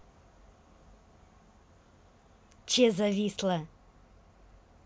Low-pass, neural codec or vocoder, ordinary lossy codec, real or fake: none; none; none; real